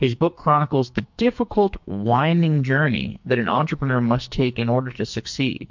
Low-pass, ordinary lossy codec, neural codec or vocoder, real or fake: 7.2 kHz; MP3, 64 kbps; codec, 44.1 kHz, 2.6 kbps, SNAC; fake